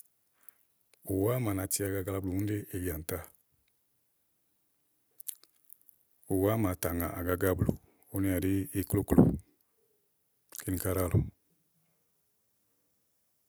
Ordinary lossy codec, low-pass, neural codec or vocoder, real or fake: none; none; none; real